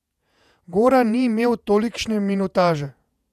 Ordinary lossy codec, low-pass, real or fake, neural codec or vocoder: none; 14.4 kHz; fake; vocoder, 48 kHz, 128 mel bands, Vocos